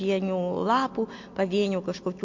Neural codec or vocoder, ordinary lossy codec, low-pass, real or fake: none; MP3, 48 kbps; 7.2 kHz; real